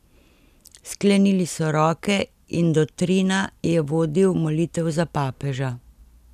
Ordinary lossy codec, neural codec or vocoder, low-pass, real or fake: none; none; 14.4 kHz; real